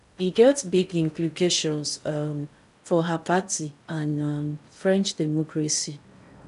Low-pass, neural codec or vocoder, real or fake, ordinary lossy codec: 10.8 kHz; codec, 16 kHz in and 24 kHz out, 0.6 kbps, FocalCodec, streaming, 2048 codes; fake; none